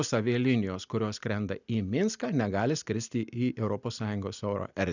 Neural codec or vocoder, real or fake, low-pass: none; real; 7.2 kHz